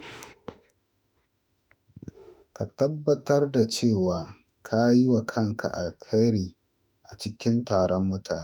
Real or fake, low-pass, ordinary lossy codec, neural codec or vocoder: fake; 19.8 kHz; none; autoencoder, 48 kHz, 32 numbers a frame, DAC-VAE, trained on Japanese speech